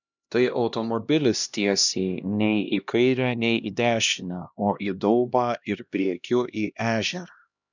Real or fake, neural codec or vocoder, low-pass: fake; codec, 16 kHz, 1 kbps, X-Codec, HuBERT features, trained on LibriSpeech; 7.2 kHz